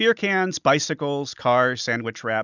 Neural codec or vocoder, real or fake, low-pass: none; real; 7.2 kHz